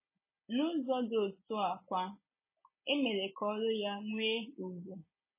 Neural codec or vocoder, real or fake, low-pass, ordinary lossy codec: none; real; 3.6 kHz; MP3, 16 kbps